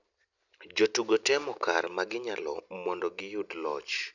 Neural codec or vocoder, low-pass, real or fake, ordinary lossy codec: none; 7.2 kHz; real; none